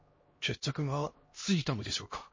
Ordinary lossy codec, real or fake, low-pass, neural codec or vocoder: MP3, 32 kbps; fake; 7.2 kHz; codec, 16 kHz, 1 kbps, X-Codec, HuBERT features, trained on LibriSpeech